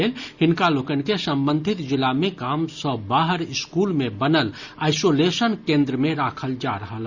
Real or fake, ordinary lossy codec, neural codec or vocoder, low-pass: real; Opus, 64 kbps; none; 7.2 kHz